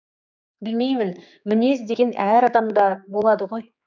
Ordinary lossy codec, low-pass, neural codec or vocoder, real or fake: none; 7.2 kHz; codec, 16 kHz, 4 kbps, X-Codec, HuBERT features, trained on general audio; fake